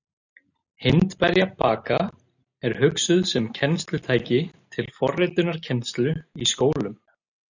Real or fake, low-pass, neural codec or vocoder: real; 7.2 kHz; none